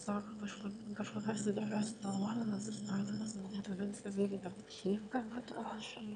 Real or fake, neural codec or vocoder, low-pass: fake; autoencoder, 22.05 kHz, a latent of 192 numbers a frame, VITS, trained on one speaker; 9.9 kHz